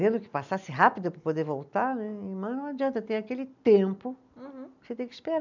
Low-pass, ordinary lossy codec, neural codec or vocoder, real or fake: 7.2 kHz; none; none; real